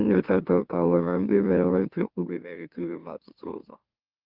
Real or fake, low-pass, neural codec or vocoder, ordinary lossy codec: fake; 5.4 kHz; autoencoder, 44.1 kHz, a latent of 192 numbers a frame, MeloTTS; Opus, 32 kbps